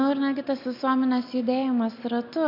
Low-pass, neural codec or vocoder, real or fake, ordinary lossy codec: 5.4 kHz; none; real; MP3, 48 kbps